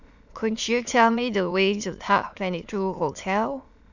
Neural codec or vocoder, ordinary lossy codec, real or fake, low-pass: autoencoder, 22.05 kHz, a latent of 192 numbers a frame, VITS, trained on many speakers; none; fake; 7.2 kHz